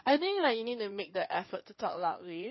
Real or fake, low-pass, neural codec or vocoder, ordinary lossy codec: fake; 7.2 kHz; codec, 16 kHz in and 24 kHz out, 2.2 kbps, FireRedTTS-2 codec; MP3, 24 kbps